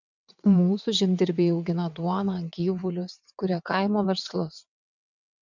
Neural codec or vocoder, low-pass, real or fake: vocoder, 22.05 kHz, 80 mel bands, WaveNeXt; 7.2 kHz; fake